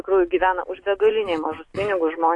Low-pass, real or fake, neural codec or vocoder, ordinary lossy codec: 10.8 kHz; real; none; AAC, 48 kbps